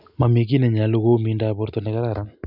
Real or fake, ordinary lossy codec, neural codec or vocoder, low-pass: real; none; none; 5.4 kHz